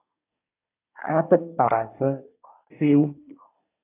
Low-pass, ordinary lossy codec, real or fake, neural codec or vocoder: 3.6 kHz; AAC, 24 kbps; fake; codec, 24 kHz, 1 kbps, SNAC